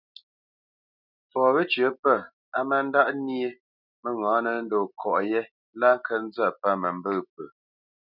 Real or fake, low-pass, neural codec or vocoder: real; 5.4 kHz; none